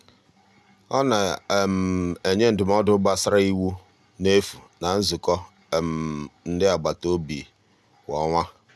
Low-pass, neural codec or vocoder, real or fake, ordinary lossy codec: none; none; real; none